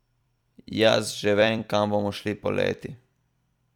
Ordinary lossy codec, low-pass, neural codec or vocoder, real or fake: none; 19.8 kHz; vocoder, 44.1 kHz, 128 mel bands every 256 samples, BigVGAN v2; fake